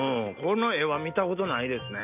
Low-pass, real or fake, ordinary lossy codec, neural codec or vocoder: 3.6 kHz; real; none; none